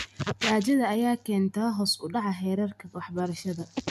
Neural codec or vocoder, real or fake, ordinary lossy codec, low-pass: none; real; none; 14.4 kHz